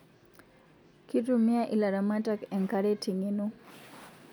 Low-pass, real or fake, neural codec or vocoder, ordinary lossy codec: none; real; none; none